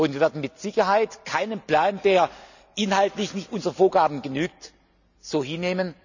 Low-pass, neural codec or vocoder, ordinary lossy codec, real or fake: 7.2 kHz; none; none; real